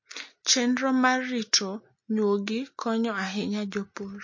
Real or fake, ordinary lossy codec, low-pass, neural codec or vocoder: real; MP3, 32 kbps; 7.2 kHz; none